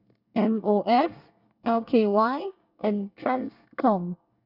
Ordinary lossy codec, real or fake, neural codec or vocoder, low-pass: none; fake; codec, 24 kHz, 1 kbps, SNAC; 5.4 kHz